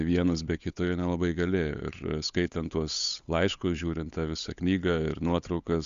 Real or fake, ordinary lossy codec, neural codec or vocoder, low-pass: fake; Opus, 64 kbps; codec, 16 kHz, 8 kbps, FunCodec, trained on Chinese and English, 25 frames a second; 7.2 kHz